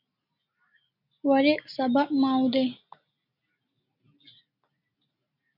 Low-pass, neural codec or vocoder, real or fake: 5.4 kHz; none; real